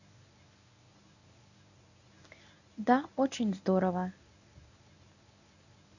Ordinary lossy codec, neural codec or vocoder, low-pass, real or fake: none; codec, 24 kHz, 0.9 kbps, WavTokenizer, medium speech release version 1; 7.2 kHz; fake